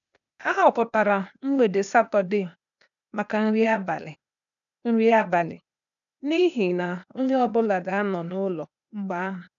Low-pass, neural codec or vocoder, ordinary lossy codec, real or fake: 7.2 kHz; codec, 16 kHz, 0.8 kbps, ZipCodec; none; fake